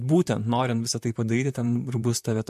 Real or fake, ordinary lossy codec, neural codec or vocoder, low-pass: fake; MP3, 64 kbps; vocoder, 44.1 kHz, 128 mel bands, Pupu-Vocoder; 14.4 kHz